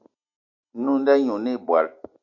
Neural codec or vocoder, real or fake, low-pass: none; real; 7.2 kHz